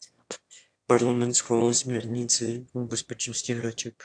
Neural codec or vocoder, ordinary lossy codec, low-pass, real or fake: autoencoder, 22.05 kHz, a latent of 192 numbers a frame, VITS, trained on one speaker; AAC, 64 kbps; 9.9 kHz; fake